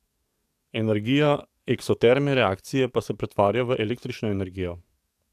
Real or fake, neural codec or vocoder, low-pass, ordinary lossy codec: fake; codec, 44.1 kHz, 7.8 kbps, DAC; 14.4 kHz; AAC, 96 kbps